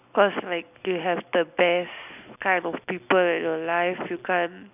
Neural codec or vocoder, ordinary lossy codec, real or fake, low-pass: none; none; real; 3.6 kHz